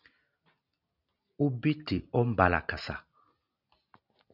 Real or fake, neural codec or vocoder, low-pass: real; none; 5.4 kHz